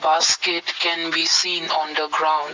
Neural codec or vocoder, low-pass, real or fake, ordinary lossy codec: none; 7.2 kHz; real; MP3, 64 kbps